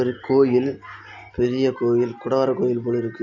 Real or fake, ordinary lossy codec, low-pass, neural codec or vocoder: real; none; 7.2 kHz; none